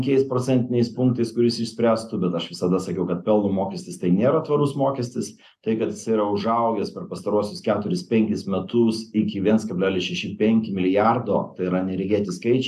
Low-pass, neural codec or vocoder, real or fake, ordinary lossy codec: 14.4 kHz; none; real; AAC, 96 kbps